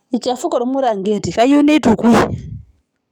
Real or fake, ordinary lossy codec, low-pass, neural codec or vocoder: fake; none; 19.8 kHz; codec, 44.1 kHz, 7.8 kbps, DAC